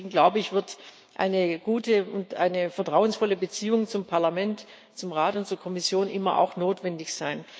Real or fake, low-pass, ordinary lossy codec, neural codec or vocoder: fake; none; none; codec, 16 kHz, 6 kbps, DAC